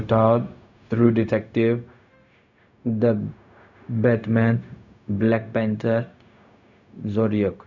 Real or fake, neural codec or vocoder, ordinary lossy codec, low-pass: fake; codec, 16 kHz, 0.4 kbps, LongCat-Audio-Codec; none; 7.2 kHz